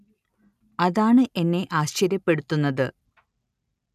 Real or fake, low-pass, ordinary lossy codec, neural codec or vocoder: real; 14.4 kHz; none; none